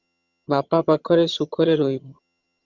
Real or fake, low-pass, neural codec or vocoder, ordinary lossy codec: fake; 7.2 kHz; vocoder, 22.05 kHz, 80 mel bands, HiFi-GAN; Opus, 64 kbps